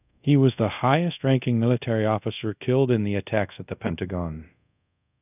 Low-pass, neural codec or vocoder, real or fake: 3.6 kHz; codec, 24 kHz, 0.5 kbps, DualCodec; fake